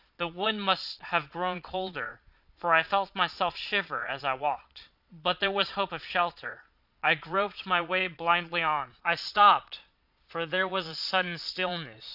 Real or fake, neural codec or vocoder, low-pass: fake; vocoder, 22.05 kHz, 80 mel bands, Vocos; 5.4 kHz